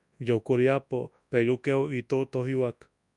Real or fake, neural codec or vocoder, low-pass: fake; codec, 24 kHz, 0.9 kbps, WavTokenizer, large speech release; 10.8 kHz